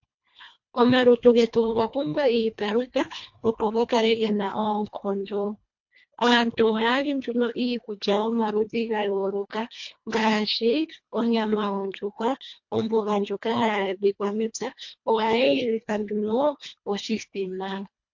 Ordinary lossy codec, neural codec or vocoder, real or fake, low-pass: MP3, 48 kbps; codec, 24 kHz, 1.5 kbps, HILCodec; fake; 7.2 kHz